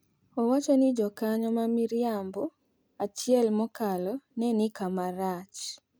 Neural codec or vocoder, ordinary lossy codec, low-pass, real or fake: none; none; none; real